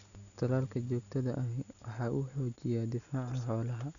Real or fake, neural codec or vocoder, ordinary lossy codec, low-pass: real; none; none; 7.2 kHz